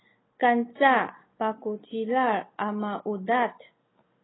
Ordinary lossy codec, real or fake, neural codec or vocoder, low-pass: AAC, 16 kbps; real; none; 7.2 kHz